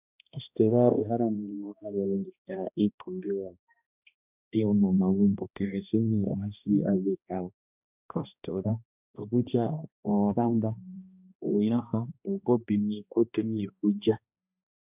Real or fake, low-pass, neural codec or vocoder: fake; 3.6 kHz; codec, 16 kHz, 1 kbps, X-Codec, HuBERT features, trained on balanced general audio